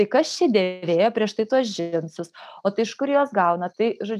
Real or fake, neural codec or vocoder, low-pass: real; none; 14.4 kHz